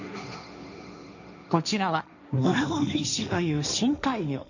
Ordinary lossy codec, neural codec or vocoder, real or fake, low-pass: none; codec, 16 kHz, 1.1 kbps, Voila-Tokenizer; fake; 7.2 kHz